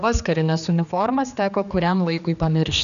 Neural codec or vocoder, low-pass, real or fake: codec, 16 kHz, 2 kbps, X-Codec, HuBERT features, trained on general audio; 7.2 kHz; fake